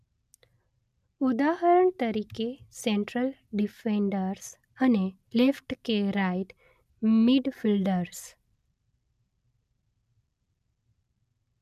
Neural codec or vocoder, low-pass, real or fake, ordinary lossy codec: none; 14.4 kHz; real; none